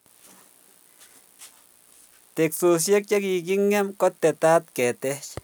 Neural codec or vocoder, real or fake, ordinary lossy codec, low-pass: none; real; none; none